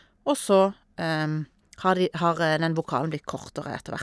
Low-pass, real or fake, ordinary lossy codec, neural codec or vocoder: none; real; none; none